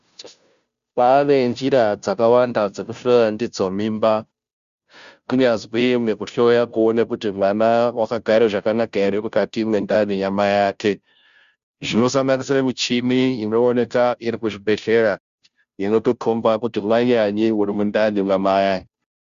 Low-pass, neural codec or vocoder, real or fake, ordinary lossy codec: 7.2 kHz; codec, 16 kHz, 0.5 kbps, FunCodec, trained on Chinese and English, 25 frames a second; fake; Opus, 64 kbps